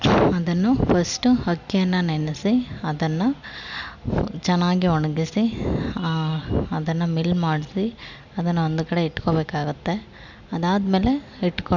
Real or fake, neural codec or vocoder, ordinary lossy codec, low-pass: real; none; none; 7.2 kHz